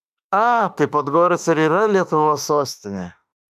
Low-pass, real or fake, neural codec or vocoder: 14.4 kHz; fake; autoencoder, 48 kHz, 32 numbers a frame, DAC-VAE, trained on Japanese speech